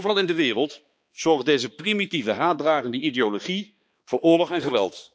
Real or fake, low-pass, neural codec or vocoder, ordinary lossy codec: fake; none; codec, 16 kHz, 2 kbps, X-Codec, HuBERT features, trained on balanced general audio; none